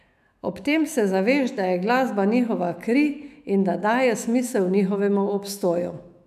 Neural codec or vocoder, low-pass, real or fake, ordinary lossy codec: autoencoder, 48 kHz, 128 numbers a frame, DAC-VAE, trained on Japanese speech; 14.4 kHz; fake; none